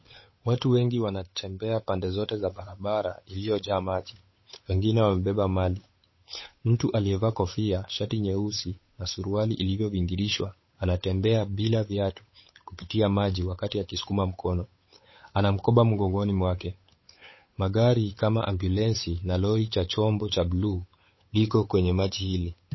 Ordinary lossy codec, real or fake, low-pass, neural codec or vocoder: MP3, 24 kbps; fake; 7.2 kHz; codec, 16 kHz, 8 kbps, FunCodec, trained on Chinese and English, 25 frames a second